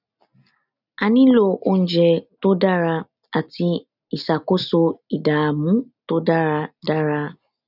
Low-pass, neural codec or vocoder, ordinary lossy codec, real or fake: 5.4 kHz; none; none; real